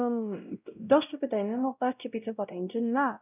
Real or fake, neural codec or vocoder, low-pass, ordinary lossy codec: fake; codec, 16 kHz, 0.5 kbps, X-Codec, WavLM features, trained on Multilingual LibriSpeech; 3.6 kHz; none